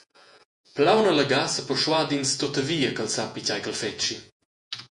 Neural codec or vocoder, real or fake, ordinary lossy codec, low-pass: vocoder, 48 kHz, 128 mel bands, Vocos; fake; MP3, 64 kbps; 10.8 kHz